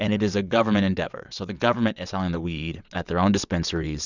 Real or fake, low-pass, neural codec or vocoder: fake; 7.2 kHz; vocoder, 22.05 kHz, 80 mel bands, WaveNeXt